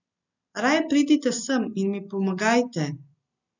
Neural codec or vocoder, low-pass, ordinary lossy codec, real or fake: none; 7.2 kHz; none; real